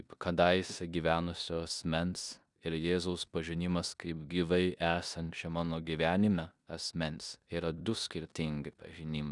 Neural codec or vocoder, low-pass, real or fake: codec, 16 kHz in and 24 kHz out, 0.9 kbps, LongCat-Audio-Codec, four codebook decoder; 10.8 kHz; fake